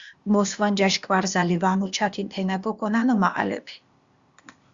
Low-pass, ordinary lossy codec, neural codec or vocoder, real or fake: 7.2 kHz; Opus, 64 kbps; codec, 16 kHz, 0.8 kbps, ZipCodec; fake